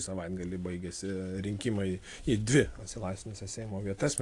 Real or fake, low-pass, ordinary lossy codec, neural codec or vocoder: real; 10.8 kHz; AAC, 48 kbps; none